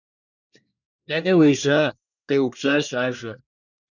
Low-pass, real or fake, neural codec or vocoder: 7.2 kHz; fake; codec, 24 kHz, 1 kbps, SNAC